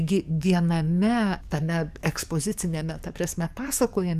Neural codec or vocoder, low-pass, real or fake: codec, 44.1 kHz, 7.8 kbps, DAC; 14.4 kHz; fake